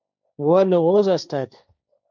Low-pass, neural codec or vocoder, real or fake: 7.2 kHz; codec, 16 kHz, 1.1 kbps, Voila-Tokenizer; fake